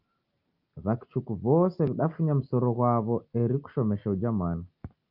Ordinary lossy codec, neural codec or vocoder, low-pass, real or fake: Opus, 24 kbps; none; 5.4 kHz; real